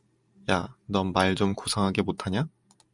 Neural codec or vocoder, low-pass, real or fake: vocoder, 44.1 kHz, 128 mel bands every 512 samples, BigVGAN v2; 10.8 kHz; fake